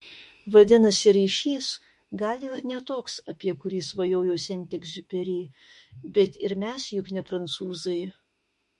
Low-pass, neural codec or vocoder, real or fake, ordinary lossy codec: 14.4 kHz; autoencoder, 48 kHz, 32 numbers a frame, DAC-VAE, trained on Japanese speech; fake; MP3, 48 kbps